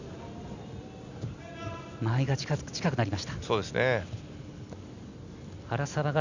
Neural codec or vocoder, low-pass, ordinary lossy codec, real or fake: none; 7.2 kHz; none; real